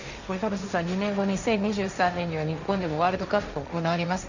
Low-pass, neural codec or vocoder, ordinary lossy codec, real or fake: 7.2 kHz; codec, 16 kHz, 1.1 kbps, Voila-Tokenizer; none; fake